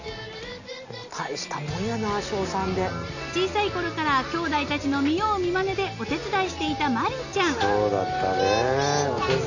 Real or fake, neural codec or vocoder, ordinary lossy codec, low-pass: real; none; none; 7.2 kHz